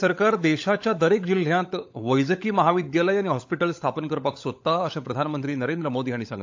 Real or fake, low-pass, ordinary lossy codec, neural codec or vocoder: fake; 7.2 kHz; AAC, 48 kbps; codec, 16 kHz, 16 kbps, FunCodec, trained on LibriTTS, 50 frames a second